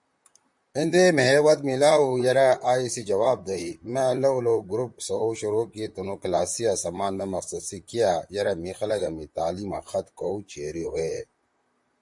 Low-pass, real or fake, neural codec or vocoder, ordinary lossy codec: 10.8 kHz; fake; vocoder, 44.1 kHz, 128 mel bands, Pupu-Vocoder; MP3, 64 kbps